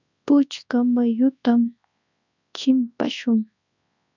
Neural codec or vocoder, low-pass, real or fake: codec, 24 kHz, 0.9 kbps, WavTokenizer, large speech release; 7.2 kHz; fake